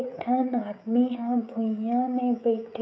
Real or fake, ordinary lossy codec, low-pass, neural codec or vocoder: fake; none; none; codec, 16 kHz, 16 kbps, FreqCodec, smaller model